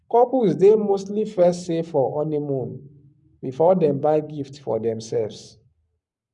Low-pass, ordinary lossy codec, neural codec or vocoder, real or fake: 10.8 kHz; none; codec, 44.1 kHz, 7.8 kbps, Pupu-Codec; fake